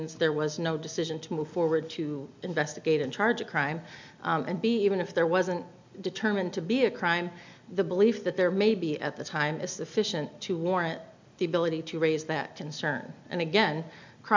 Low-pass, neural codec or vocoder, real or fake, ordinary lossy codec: 7.2 kHz; none; real; MP3, 64 kbps